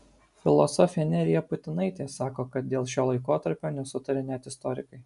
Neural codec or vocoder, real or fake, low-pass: none; real; 10.8 kHz